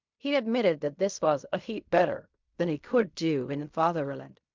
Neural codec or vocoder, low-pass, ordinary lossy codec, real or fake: codec, 16 kHz in and 24 kHz out, 0.4 kbps, LongCat-Audio-Codec, fine tuned four codebook decoder; 7.2 kHz; MP3, 64 kbps; fake